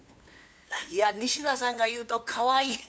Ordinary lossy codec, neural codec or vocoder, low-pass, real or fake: none; codec, 16 kHz, 2 kbps, FunCodec, trained on LibriTTS, 25 frames a second; none; fake